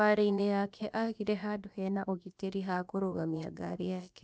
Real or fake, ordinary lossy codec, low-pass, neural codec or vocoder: fake; none; none; codec, 16 kHz, about 1 kbps, DyCAST, with the encoder's durations